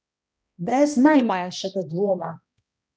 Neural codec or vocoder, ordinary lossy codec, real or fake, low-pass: codec, 16 kHz, 1 kbps, X-Codec, HuBERT features, trained on balanced general audio; none; fake; none